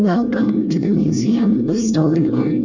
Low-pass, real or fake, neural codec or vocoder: 7.2 kHz; fake; codec, 24 kHz, 1 kbps, SNAC